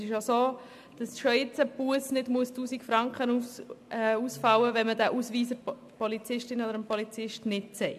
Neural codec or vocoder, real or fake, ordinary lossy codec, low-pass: none; real; MP3, 96 kbps; 14.4 kHz